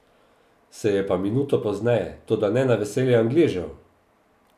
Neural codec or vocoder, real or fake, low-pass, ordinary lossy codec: none; real; 14.4 kHz; none